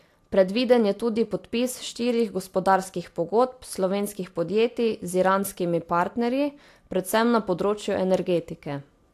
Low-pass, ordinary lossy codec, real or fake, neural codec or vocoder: 14.4 kHz; AAC, 64 kbps; real; none